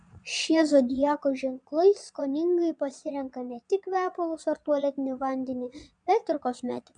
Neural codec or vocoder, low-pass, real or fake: vocoder, 22.05 kHz, 80 mel bands, Vocos; 9.9 kHz; fake